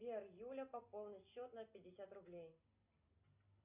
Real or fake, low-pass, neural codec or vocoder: real; 3.6 kHz; none